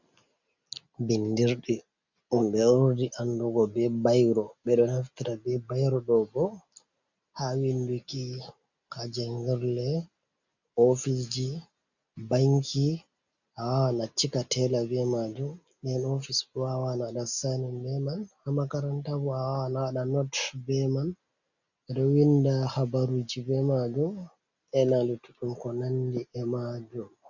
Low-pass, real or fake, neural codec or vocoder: 7.2 kHz; real; none